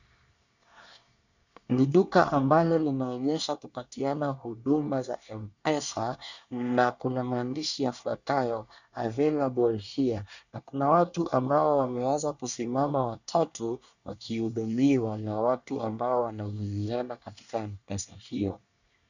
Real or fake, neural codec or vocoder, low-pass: fake; codec, 24 kHz, 1 kbps, SNAC; 7.2 kHz